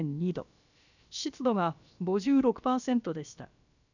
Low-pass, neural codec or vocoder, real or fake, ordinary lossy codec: 7.2 kHz; codec, 16 kHz, about 1 kbps, DyCAST, with the encoder's durations; fake; none